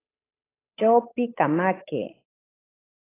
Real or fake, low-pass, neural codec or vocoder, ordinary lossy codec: fake; 3.6 kHz; codec, 16 kHz, 8 kbps, FunCodec, trained on Chinese and English, 25 frames a second; AAC, 24 kbps